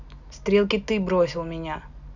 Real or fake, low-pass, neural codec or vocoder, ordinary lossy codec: real; 7.2 kHz; none; none